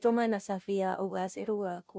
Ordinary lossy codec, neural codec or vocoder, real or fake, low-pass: none; codec, 16 kHz, 0.5 kbps, FunCodec, trained on Chinese and English, 25 frames a second; fake; none